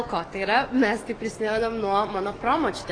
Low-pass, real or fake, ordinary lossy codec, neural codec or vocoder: 9.9 kHz; fake; AAC, 32 kbps; codec, 44.1 kHz, 7.8 kbps, DAC